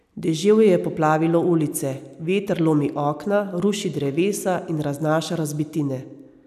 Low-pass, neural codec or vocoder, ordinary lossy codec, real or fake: 14.4 kHz; none; none; real